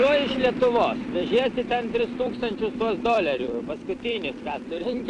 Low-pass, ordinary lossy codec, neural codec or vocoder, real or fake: 10.8 kHz; AAC, 48 kbps; vocoder, 44.1 kHz, 128 mel bands every 256 samples, BigVGAN v2; fake